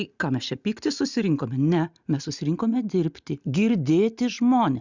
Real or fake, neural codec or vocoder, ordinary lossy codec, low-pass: real; none; Opus, 64 kbps; 7.2 kHz